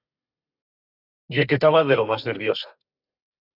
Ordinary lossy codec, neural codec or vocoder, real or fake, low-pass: Opus, 64 kbps; codec, 32 kHz, 1.9 kbps, SNAC; fake; 5.4 kHz